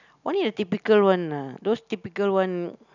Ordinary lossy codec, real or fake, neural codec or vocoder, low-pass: none; real; none; 7.2 kHz